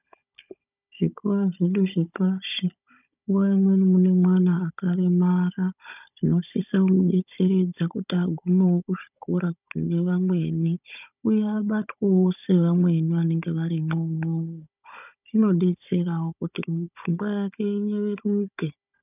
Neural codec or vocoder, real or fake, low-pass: codec, 16 kHz, 16 kbps, FunCodec, trained on Chinese and English, 50 frames a second; fake; 3.6 kHz